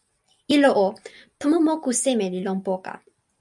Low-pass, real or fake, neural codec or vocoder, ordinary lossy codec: 10.8 kHz; real; none; MP3, 96 kbps